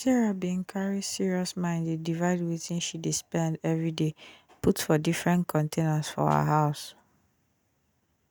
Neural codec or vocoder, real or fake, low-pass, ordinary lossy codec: none; real; none; none